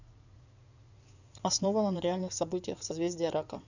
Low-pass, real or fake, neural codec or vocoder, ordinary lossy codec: 7.2 kHz; fake; codec, 16 kHz in and 24 kHz out, 2.2 kbps, FireRedTTS-2 codec; none